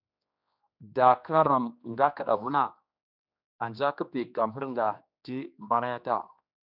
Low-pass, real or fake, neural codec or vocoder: 5.4 kHz; fake; codec, 16 kHz, 2 kbps, X-Codec, HuBERT features, trained on general audio